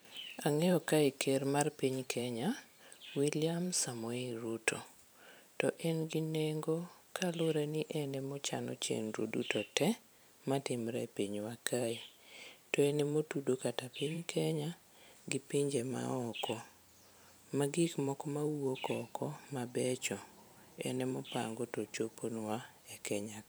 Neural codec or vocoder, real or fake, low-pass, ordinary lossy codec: vocoder, 44.1 kHz, 128 mel bands every 512 samples, BigVGAN v2; fake; none; none